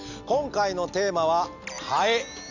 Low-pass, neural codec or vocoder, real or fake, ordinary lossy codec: 7.2 kHz; none; real; none